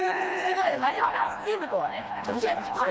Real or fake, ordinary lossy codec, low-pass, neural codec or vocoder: fake; none; none; codec, 16 kHz, 1 kbps, FreqCodec, smaller model